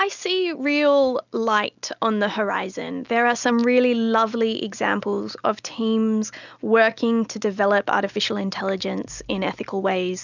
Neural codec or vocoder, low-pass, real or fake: none; 7.2 kHz; real